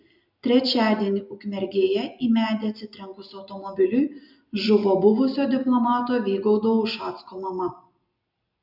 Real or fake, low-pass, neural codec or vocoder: real; 5.4 kHz; none